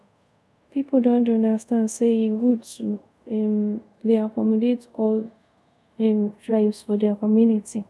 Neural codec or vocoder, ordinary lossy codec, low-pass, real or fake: codec, 24 kHz, 0.5 kbps, DualCodec; none; none; fake